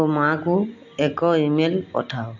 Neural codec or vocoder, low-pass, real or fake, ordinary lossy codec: none; 7.2 kHz; real; MP3, 48 kbps